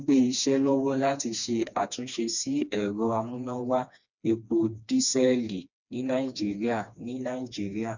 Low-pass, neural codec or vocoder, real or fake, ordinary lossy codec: 7.2 kHz; codec, 16 kHz, 2 kbps, FreqCodec, smaller model; fake; none